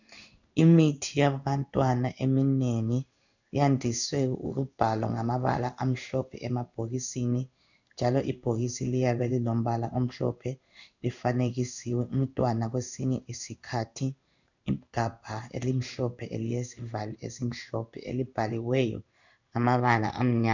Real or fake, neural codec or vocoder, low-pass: fake; codec, 16 kHz in and 24 kHz out, 1 kbps, XY-Tokenizer; 7.2 kHz